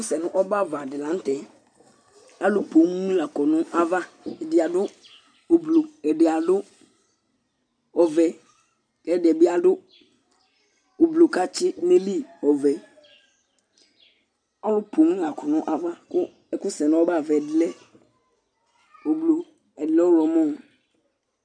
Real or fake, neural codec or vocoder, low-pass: real; none; 9.9 kHz